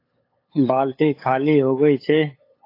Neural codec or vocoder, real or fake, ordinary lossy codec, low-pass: codec, 16 kHz, 8 kbps, FunCodec, trained on LibriTTS, 25 frames a second; fake; AAC, 32 kbps; 5.4 kHz